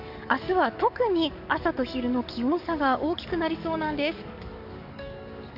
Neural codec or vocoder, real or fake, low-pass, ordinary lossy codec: codec, 44.1 kHz, 7.8 kbps, DAC; fake; 5.4 kHz; none